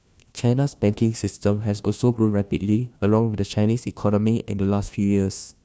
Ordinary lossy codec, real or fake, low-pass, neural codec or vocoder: none; fake; none; codec, 16 kHz, 1 kbps, FunCodec, trained on LibriTTS, 50 frames a second